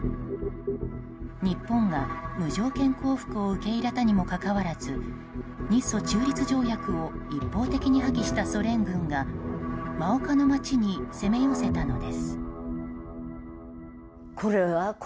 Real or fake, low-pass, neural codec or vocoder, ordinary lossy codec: real; none; none; none